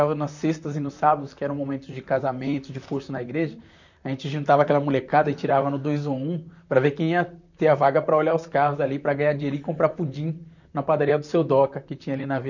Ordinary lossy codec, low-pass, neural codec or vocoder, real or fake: none; 7.2 kHz; vocoder, 44.1 kHz, 128 mel bands, Pupu-Vocoder; fake